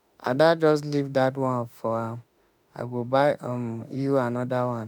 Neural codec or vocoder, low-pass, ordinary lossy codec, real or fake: autoencoder, 48 kHz, 32 numbers a frame, DAC-VAE, trained on Japanese speech; none; none; fake